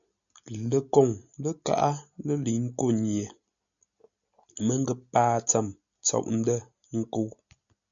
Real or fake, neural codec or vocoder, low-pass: real; none; 7.2 kHz